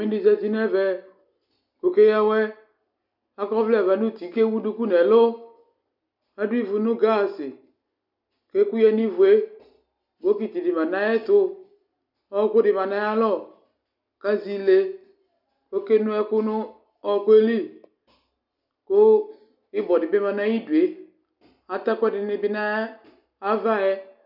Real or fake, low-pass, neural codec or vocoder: real; 5.4 kHz; none